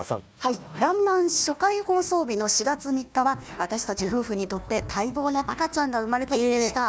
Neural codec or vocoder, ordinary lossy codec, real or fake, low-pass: codec, 16 kHz, 1 kbps, FunCodec, trained on Chinese and English, 50 frames a second; none; fake; none